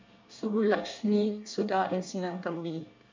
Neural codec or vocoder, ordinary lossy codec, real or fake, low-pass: codec, 24 kHz, 1 kbps, SNAC; MP3, 64 kbps; fake; 7.2 kHz